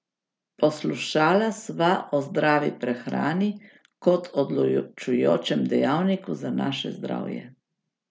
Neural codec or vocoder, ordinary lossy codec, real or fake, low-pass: none; none; real; none